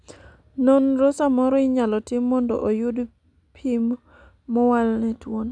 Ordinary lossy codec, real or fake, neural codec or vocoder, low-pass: none; real; none; 9.9 kHz